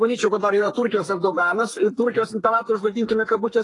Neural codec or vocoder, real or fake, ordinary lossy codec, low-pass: codec, 32 kHz, 1.9 kbps, SNAC; fake; AAC, 32 kbps; 10.8 kHz